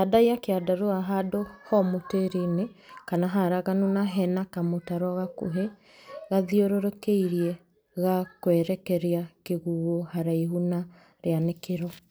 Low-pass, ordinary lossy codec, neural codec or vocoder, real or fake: none; none; none; real